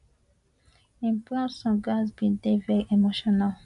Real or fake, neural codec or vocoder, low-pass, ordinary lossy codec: real; none; 10.8 kHz; none